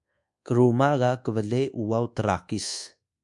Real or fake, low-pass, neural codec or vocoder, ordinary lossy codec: fake; 10.8 kHz; codec, 24 kHz, 1.2 kbps, DualCodec; MP3, 64 kbps